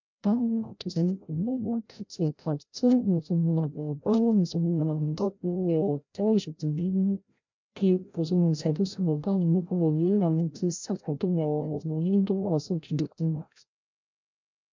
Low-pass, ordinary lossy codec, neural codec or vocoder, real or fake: 7.2 kHz; MP3, 64 kbps; codec, 16 kHz, 0.5 kbps, FreqCodec, larger model; fake